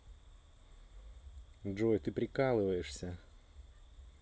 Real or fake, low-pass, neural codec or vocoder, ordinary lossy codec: real; none; none; none